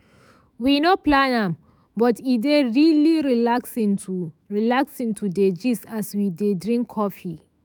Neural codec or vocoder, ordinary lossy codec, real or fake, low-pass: autoencoder, 48 kHz, 128 numbers a frame, DAC-VAE, trained on Japanese speech; none; fake; none